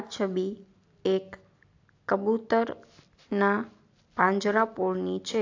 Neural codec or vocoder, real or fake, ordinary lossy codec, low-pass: none; real; AAC, 48 kbps; 7.2 kHz